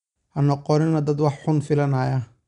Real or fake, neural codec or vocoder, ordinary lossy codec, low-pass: real; none; MP3, 96 kbps; 10.8 kHz